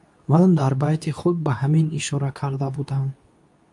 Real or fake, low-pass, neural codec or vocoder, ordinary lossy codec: fake; 10.8 kHz; codec, 24 kHz, 0.9 kbps, WavTokenizer, medium speech release version 2; AAC, 64 kbps